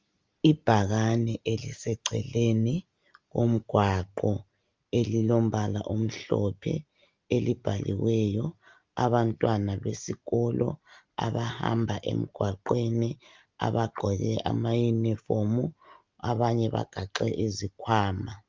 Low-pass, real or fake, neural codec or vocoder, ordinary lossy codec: 7.2 kHz; real; none; Opus, 32 kbps